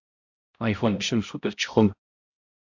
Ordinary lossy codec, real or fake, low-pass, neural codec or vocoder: MP3, 48 kbps; fake; 7.2 kHz; codec, 16 kHz, 0.5 kbps, X-Codec, HuBERT features, trained on balanced general audio